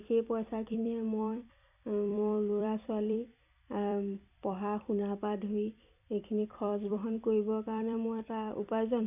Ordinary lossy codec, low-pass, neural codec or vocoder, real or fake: none; 3.6 kHz; vocoder, 44.1 kHz, 128 mel bands every 256 samples, BigVGAN v2; fake